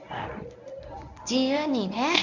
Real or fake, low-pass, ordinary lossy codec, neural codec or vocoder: fake; 7.2 kHz; none; codec, 24 kHz, 0.9 kbps, WavTokenizer, medium speech release version 1